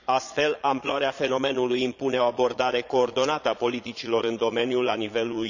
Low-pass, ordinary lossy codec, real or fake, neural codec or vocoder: 7.2 kHz; none; fake; vocoder, 22.05 kHz, 80 mel bands, Vocos